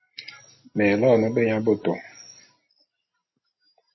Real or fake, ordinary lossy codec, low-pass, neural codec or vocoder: real; MP3, 24 kbps; 7.2 kHz; none